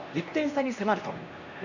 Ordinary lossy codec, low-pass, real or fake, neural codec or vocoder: none; 7.2 kHz; fake; codec, 16 kHz, 1 kbps, X-Codec, HuBERT features, trained on LibriSpeech